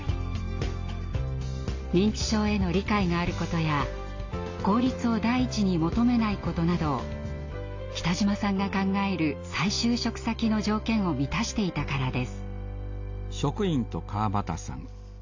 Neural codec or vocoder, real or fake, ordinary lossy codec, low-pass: none; real; AAC, 48 kbps; 7.2 kHz